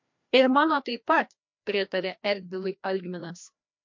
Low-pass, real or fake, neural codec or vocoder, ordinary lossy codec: 7.2 kHz; fake; codec, 16 kHz, 1 kbps, FreqCodec, larger model; MP3, 48 kbps